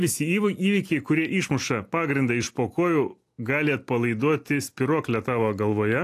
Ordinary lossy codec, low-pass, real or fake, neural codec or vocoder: AAC, 64 kbps; 14.4 kHz; real; none